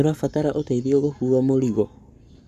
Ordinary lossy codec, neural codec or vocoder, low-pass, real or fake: none; codec, 44.1 kHz, 7.8 kbps, Pupu-Codec; 14.4 kHz; fake